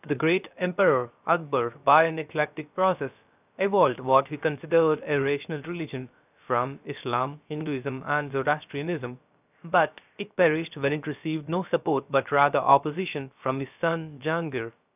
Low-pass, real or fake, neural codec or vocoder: 3.6 kHz; fake; codec, 16 kHz, about 1 kbps, DyCAST, with the encoder's durations